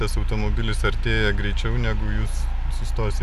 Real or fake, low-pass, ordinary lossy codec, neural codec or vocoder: real; 14.4 kHz; MP3, 96 kbps; none